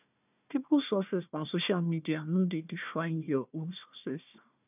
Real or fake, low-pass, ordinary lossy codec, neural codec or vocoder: fake; 3.6 kHz; none; codec, 16 kHz, 1 kbps, FunCodec, trained on Chinese and English, 50 frames a second